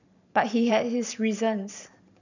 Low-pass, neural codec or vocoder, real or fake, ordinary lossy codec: 7.2 kHz; vocoder, 44.1 kHz, 128 mel bands every 512 samples, BigVGAN v2; fake; none